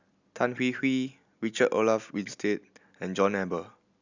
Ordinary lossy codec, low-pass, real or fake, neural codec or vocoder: none; 7.2 kHz; real; none